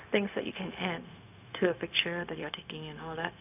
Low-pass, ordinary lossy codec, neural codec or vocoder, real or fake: 3.6 kHz; none; codec, 16 kHz, 0.4 kbps, LongCat-Audio-Codec; fake